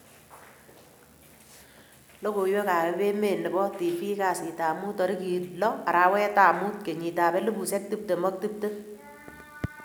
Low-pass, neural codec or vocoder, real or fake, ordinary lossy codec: none; none; real; none